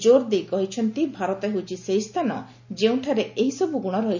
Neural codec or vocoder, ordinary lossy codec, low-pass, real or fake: none; none; 7.2 kHz; real